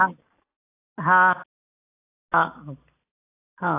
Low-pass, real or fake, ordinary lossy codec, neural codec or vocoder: 3.6 kHz; real; AAC, 16 kbps; none